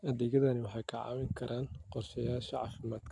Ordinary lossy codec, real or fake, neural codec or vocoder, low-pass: AAC, 64 kbps; real; none; 10.8 kHz